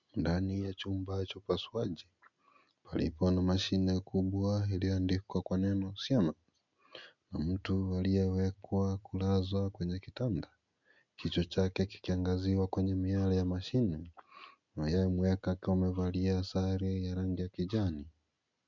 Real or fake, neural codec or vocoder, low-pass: real; none; 7.2 kHz